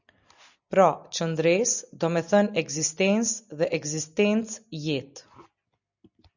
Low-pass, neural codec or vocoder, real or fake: 7.2 kHz; none; real